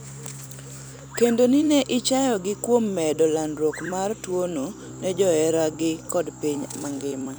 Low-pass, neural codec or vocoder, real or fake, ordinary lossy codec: none; vocoder, 44.1 kHz, 128 mel bands every 256 samples, BigVGAN v2; fake; none